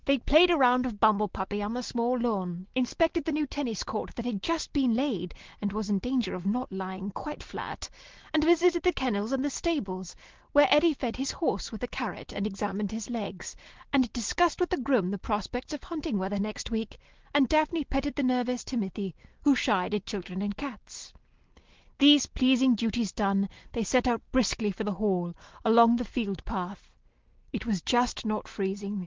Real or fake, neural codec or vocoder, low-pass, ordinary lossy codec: real; none; 7.2 kHz; Opus, 16 kbps